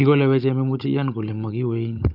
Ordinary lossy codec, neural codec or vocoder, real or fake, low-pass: none; codec, 16 kHz, 16 kbps, FunCodec, trained on Chinese and English, 50 frames a second; fake; 5.4 kHz